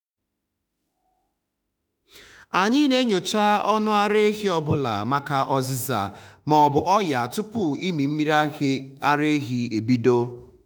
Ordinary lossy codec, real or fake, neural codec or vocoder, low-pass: none; fake; autoencoder, 48 kHz, 32 numbers a frame, DAC-VAE, trained on Japanese speech; none